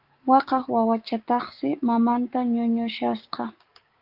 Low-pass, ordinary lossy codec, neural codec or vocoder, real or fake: 5.4 kHz; Opus, 32 kbps; codec, 16 kHz, 6 kbps, DAC; fake